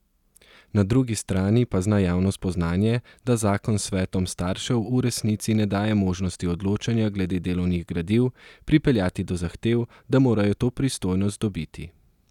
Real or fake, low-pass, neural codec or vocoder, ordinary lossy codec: real; 19.8 kHz; none; none